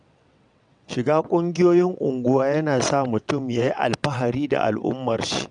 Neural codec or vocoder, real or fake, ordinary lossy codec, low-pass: vocoder, 22.05 kHz, 80 mel bands, WaveNeXt; fake; none; 9.9 kHz